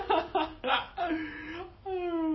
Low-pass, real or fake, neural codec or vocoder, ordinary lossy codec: 7.2 kHz; real; none; MP3, 24 kbps